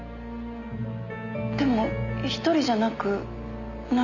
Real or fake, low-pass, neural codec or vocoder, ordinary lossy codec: real; 7.2 kHz; none; none